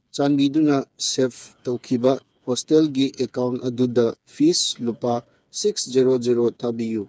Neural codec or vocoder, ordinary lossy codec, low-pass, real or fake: codec, 16 kHz, 4 kbps, FreqCodec, smaller model; none; none; fake